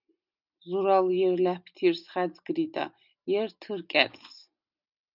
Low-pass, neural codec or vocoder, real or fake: 5.4 kHz; none; real